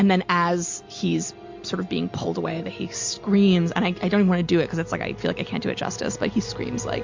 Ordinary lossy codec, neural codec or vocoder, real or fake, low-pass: MP3, 48 kbps; none; real; 7.2 kHz